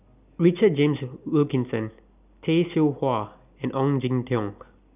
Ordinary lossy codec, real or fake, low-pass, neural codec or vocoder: none; real; 3.6 kHz; none